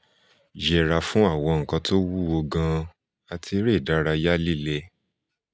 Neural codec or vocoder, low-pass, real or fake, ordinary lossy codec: none; none; real; none